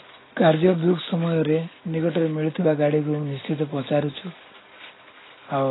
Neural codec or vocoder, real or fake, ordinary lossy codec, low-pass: none; real; AAC, 16 kbps; 7.2 kHz